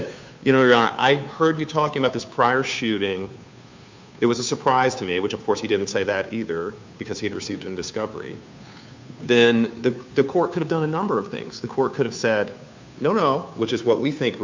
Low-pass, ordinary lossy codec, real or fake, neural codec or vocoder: 7.2 kHz; MP3, 64 kbps; fake; codec, 16 kHz, 2 kbps, FunCodec, trained on Chinese and English, 25 frames a second